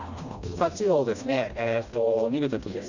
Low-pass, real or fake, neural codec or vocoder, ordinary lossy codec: 7.2 kHz; fake; codec, 16 kHz, 1 kbps, FreqCodec, smaller model; Opus, 64 kbps